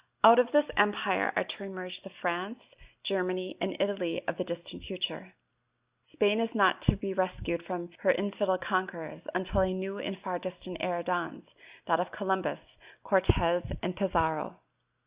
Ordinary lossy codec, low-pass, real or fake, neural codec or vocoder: Opus, 64 kbps; 3.6 kHz; real; none